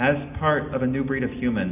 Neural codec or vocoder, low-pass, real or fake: none; 3.6 kHz; real